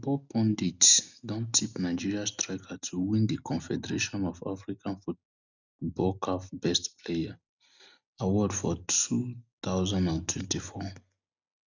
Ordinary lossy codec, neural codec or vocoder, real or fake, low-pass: none; none; real; 7.2 kHz